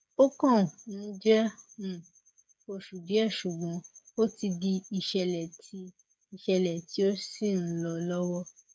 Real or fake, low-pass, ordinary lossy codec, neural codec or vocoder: fake; none; none; codec, 16 kHz, 8 kbps, FreqCodec, smaller model